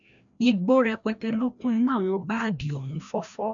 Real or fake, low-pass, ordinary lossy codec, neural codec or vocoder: fake; 7.2 kHz; none; codec, 16 kHz, 1 kbps, FreqCodec, larger model